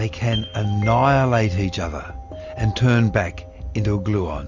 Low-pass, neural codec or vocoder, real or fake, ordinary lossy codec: 7.2 kHz; none; real; Opus, 64 kbps